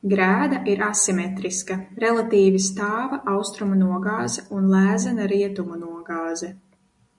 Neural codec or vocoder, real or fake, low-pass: none; real; 10.8 kHz